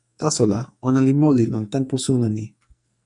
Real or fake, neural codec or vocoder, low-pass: fake; codec, 44.1 kHz, 2.6 kbps, SNAC; 10.8 kHz